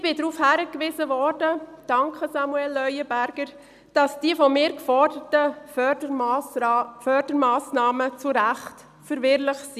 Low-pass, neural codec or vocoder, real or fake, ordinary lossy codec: 14.4 kHz; none; real; none